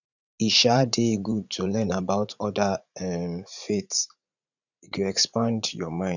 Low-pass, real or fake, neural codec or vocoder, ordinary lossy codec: 7.2 kHz; fake; vocoder, 44.1 kHz, 80 mel bands, Vocos; none